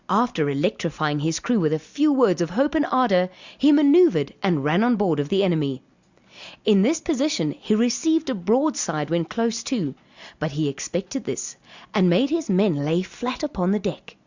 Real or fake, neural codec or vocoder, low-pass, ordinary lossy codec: real; none; 7.2 kHz; Opus, 64 kbps